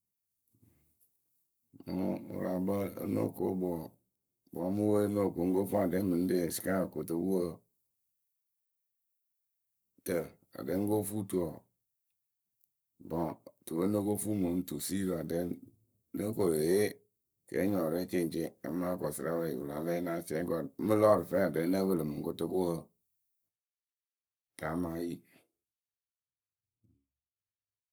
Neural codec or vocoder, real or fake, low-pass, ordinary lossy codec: codec, 44.1 kHz, 7.8 kbps, Pupu-Codec; fake; none; none